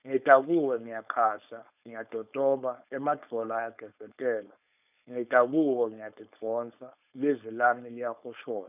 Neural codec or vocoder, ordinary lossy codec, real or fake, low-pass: codec, 16 kHz, 4.8 kbps, FACodec; none; fake; 3.6 kHz